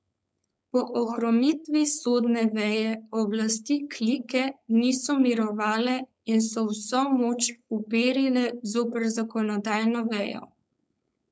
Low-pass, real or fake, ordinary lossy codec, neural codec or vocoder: none; fake; none; codec, 16 kHz, 4.8 kbps, FACodec